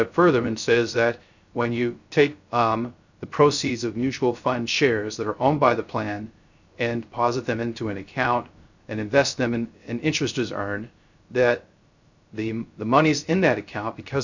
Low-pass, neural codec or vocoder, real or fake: 7.2 kHz; codec, 16 kHz, 0.3 kbps, FocalCodec; fake